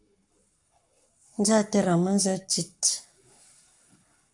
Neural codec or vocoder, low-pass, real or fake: codec, 44.1 kHz, 7.8 kbps, Pupu-Codec; 10.8 kHz; fake